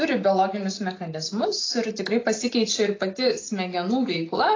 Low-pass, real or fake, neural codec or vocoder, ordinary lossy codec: 7.2 kHz; real; none; AAC, 32 kbps